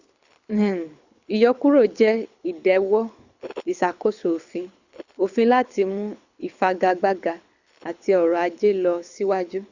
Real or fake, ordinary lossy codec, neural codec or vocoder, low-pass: fake; Opus, 64 kbps; codec, 24 kHz, 6 kbps, HILCodec; 7.2 kHz